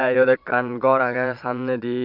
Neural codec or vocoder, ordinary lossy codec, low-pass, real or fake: vocoder, 22.05 kHz, 80 mel bands, Vocos; none; 5.4 kHz; fake